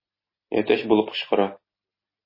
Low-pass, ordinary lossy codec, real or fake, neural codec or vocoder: 5.4 kHz; MP3, 24 kbps; real; none